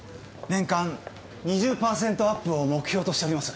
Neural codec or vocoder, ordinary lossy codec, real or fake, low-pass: none; none; real; none